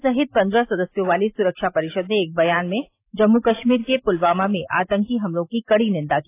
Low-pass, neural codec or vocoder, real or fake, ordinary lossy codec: 3.6 kHz; none; real; AAC, 24 kbps